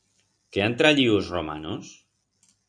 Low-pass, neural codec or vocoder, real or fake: 9.9 kHz; none; real